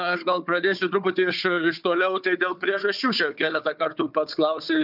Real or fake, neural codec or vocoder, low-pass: fake; codec, 16 kHz, 4 kbps, FunCodec, trained on Chinese and English, 50 frames a second; 5.4 kHz